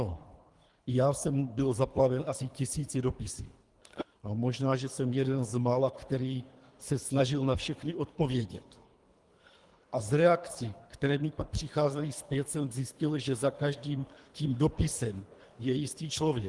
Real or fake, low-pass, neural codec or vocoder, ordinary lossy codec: fake; 10.8 kHz; codec, 24 kHz, 3 kbps, HILCodec; Opus, 24 kbps